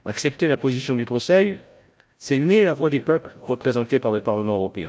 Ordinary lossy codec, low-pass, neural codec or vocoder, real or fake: none; none; codec, 16 kHz, 0.5 kbps, FreqCodec, larger model; fake